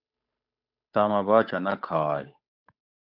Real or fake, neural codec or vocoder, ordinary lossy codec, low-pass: fake; codec, 16 kHz, 8 kbps, FunCodec, trained on Chinese and English, 25 frames a second; AAC, 48 kbps; 5.4 kHz